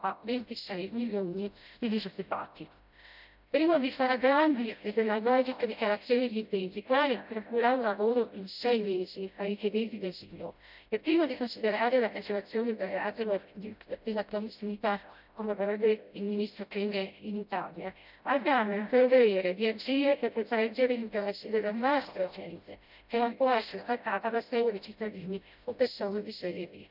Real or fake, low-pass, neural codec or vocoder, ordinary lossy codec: fake; 5.4 kHz; codec, 16 kHz, 0.5 kbps, FreqCodec, smaller model; none